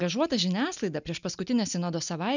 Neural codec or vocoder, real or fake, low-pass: none; real; 7.2 kHz